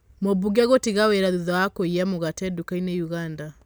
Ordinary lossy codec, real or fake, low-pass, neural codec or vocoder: none; real; none; none